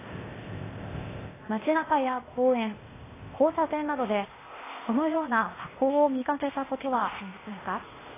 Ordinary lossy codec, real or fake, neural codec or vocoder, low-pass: AAC, 16 kbps; fake; codec, 16 kHz, 0.8 kbps, ZipCodec; 3.6 kHz